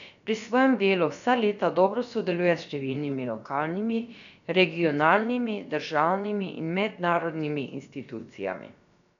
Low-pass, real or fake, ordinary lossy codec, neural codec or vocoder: 7.2 kHz; fake; none; codec, 16 kHz, about 1 kbps, DyCAST, with the encoder's durations